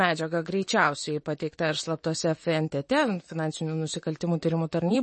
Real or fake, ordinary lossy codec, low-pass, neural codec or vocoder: fake; MP3, 32 kbps; 10.8 kHz; vocoder, 44.1 kHz, 128 mel bands, Pupu-Vocoder